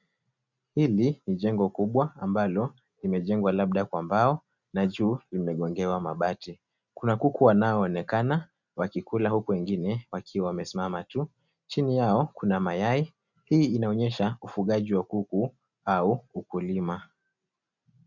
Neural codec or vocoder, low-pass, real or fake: none; 7.2 kHz; real